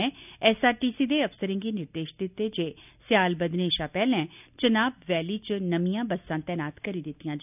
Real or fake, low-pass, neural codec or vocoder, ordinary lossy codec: real; 3.6 kHz; none; none